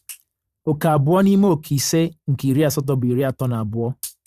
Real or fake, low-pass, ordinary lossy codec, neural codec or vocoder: fake; 14.4 kHz; Opus, 64 kbps; vocoder, 44.1 kHz, 128 mel bands every 512 samples, BigVGAN v2